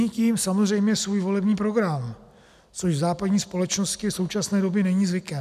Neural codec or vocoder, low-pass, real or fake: none; 14.4 kHz; real